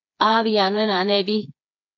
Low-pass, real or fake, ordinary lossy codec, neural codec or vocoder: 7.2 kHz; fake; AAC, 48 kbps; codec, 16 kHz, 4 kbps, FreqCodec, smaller model